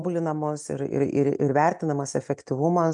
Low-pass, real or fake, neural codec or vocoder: 10.8 kHz; real; none